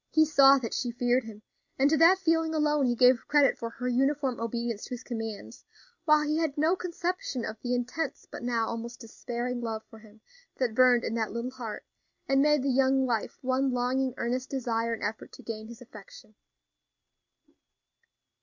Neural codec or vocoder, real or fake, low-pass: none; real; 7.2 kHz